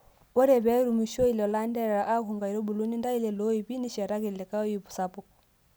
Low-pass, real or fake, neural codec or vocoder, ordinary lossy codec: none; real; none; none